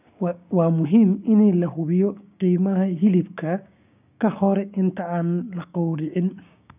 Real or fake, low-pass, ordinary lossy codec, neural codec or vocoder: fake; 3.6 kHz; none; codec, 16 kHz, 6 kbps, DAC